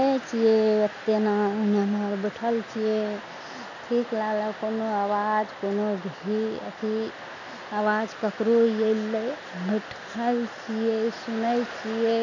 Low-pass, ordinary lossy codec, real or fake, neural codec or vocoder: 7.2 kHz; none; real; none